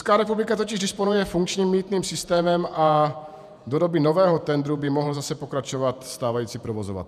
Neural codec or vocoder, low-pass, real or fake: vocoder, 48 kHz, 128 mel bands, Vocos; 14.4 kHz; fake